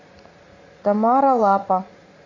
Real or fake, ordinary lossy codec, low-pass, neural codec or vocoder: fake; AAC, 48 kbps; 7.2 kHz; vocoder, 44.1 kHz, 80 mel bands, Vocos